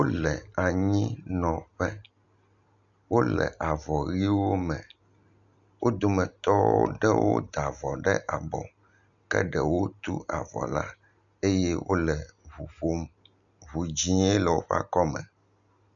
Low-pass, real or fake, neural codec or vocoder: 7.2 kHz; real; none